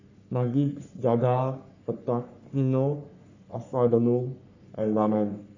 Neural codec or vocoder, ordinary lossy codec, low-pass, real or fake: codec, 44.1 kHz, 3.4 kbps, Pupu-Codec; none; 7.2 kHz; fake